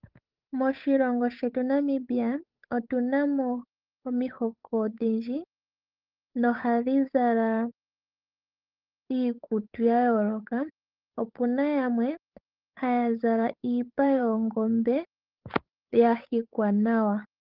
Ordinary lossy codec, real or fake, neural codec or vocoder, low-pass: Opus, 32 kbps; fake; codec, 16 kHz, 8 kbps, FunCodec, trained on Chinese and English, 25 frames a second; 5.4 kHz